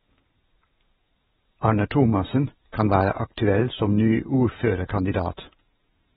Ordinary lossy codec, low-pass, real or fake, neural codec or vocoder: AAC, 16 kbps; 19.8 kHz; fake; vocoder, 48 kHz, 128 mel bands, Vocos